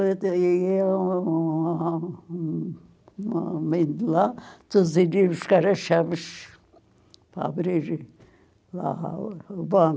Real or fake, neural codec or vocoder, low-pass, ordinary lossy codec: real; none; none; none